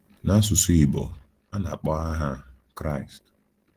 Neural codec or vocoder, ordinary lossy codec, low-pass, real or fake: none; Opus, 16 kbps; 14.4 kHz; real